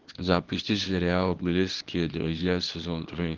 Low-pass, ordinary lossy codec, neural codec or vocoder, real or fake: 7.2 kHz; Opus, 16 kbps; codec, 24 kHz, 0.9 kbps, WavTokenizer, small release; fake